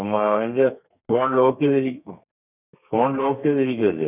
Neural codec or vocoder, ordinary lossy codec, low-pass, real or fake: codec, 32 kHz, 1.9 kbps, SNAC; none; 3.6 kHz; fake